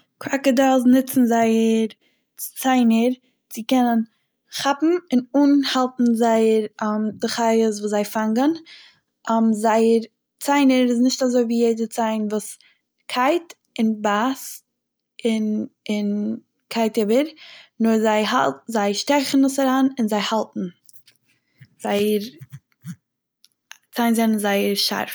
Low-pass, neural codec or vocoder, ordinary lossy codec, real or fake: none; none; none; real